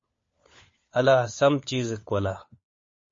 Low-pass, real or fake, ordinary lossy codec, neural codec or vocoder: 7.2 kHz; fake; MP3, 32 kbps; codec, 16 kHz, 4 kbps, FunCodec, trained on LibriTTS, 50 frames a second